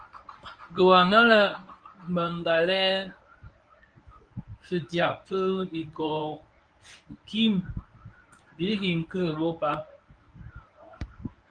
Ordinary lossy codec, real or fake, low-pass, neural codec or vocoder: Opus, 32 kbps; fake; 9.9 kHz; codec, 24 kHz, 0.9 kbps, WavTokenizer, medium speech release version 1